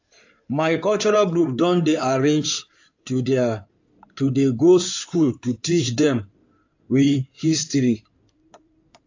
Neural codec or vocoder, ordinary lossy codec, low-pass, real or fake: codec, 16 kHz in and 24 kHz out, 2.2 kbps, FireRedTTS-2 codec; AAC, 48 kbps; 7.2 kHz; fake